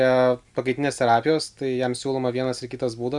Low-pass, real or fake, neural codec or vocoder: 10.8 kHz; real; none